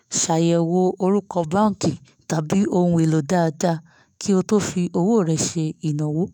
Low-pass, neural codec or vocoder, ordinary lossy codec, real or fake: none; autoencoder, 48 kHz, 128 numbers a frame, DAC-VAE, trained on Japanese speech; none; fake